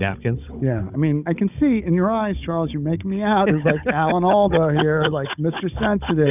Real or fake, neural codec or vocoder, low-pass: fake; codec, 16 kHz, 16 kbps, FunCodec, trained on Chinese and English, 50 frames a second; 3.6 kHz